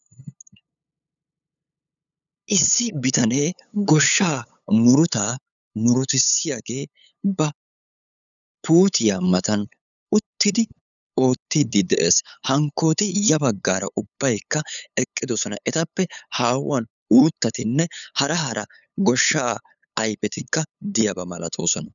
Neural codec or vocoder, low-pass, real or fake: codec, 16 kHz, 8 kbps, FunCodec, trained on LibriTTS, 25 frames a second; 7.2 kHz; fake